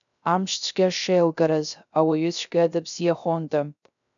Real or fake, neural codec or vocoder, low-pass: fake; codec, 16 kHz, 0.3 kbps, FocalCodec; 7.2 kHz